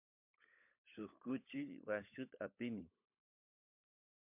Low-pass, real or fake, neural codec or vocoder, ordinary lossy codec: 3.6 kHz; fake; codec, 16 kHz, 16 kbps, FunCodec, trained on LibriTTS, 50 frames a second; MP3, 32 kbps